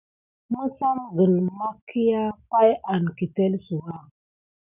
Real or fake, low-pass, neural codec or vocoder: real; 3.6 kHz; none